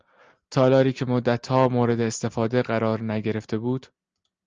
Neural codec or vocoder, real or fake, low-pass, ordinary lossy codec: none; real; 7.2 kHz; Opus, 24 kbps